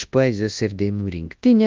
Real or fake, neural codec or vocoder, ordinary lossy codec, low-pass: fake; codec, 24 kHz, 0.9 kbps, WavTokenizer, large speech release; Opus, 32 kbps; 7.2 kHz